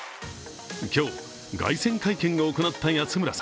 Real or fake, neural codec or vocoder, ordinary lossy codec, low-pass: real; none; none; none